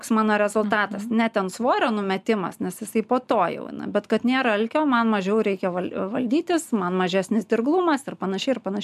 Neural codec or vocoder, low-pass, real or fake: none; 14.4 kHz; real